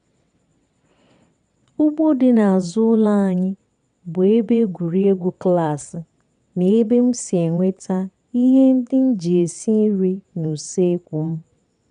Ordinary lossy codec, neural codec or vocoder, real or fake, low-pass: none; vocoder, 22.05 kHz, 80 mel bands, WaveNeXt; fake; 9.9 kHz